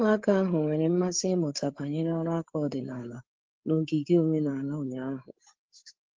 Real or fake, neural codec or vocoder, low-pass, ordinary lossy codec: fake; codec, 16 kHz, 8 kbps, FreqCodec, larger model; 7.2 kHz; Opus, 16 kbps